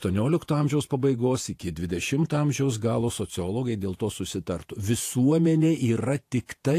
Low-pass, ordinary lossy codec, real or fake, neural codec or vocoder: 14.4 kHz; AAC, 64 kbps; fake; vocoder, 48 kHz, 128 mel bands, Vocos